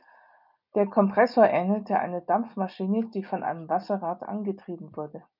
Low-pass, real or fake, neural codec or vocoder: 5.4 kHz; real; none